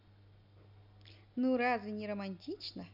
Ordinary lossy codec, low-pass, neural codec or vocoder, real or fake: none; 5.4 kHz; none; real